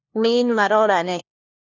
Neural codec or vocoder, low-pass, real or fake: codec, 16 kHz, 1 kbps, FunCodec, trained on LibriTTS, 50 frames a second; 7.2 kHz; fake